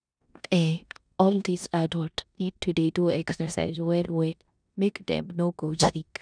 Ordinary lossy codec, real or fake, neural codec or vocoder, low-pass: none; fake; codec, 16 kHz in and 24 kHz out, 0.9 kbps, LongCat-Audio-Codec, four codebook decoder; 9.9 kHz